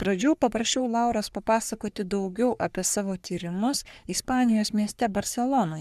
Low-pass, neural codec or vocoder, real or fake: 14.4 kHz; codec, 44.1 kHz, 3.4 kbps, Pupu-Codec; fake